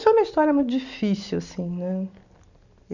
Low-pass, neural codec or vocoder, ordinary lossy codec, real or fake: 7.2 kHz; none; none; real